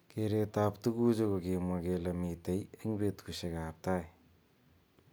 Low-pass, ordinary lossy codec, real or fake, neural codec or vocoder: none; none; real; none